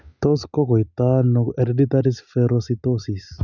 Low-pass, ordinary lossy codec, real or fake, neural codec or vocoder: 7.2 kHz; none; real; none